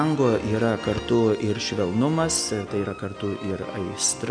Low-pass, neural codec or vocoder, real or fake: 9.9 kHz; none; real